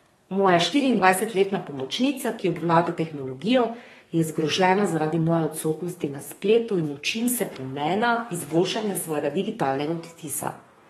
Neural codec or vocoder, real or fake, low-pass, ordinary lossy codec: codec, 32 kHz, 1.9 kbps, SNAC; fake; 14.4 kHz; AAC, 32 kbps